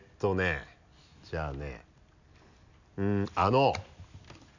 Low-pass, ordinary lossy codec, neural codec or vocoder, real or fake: 7.2 kHz; none; none; real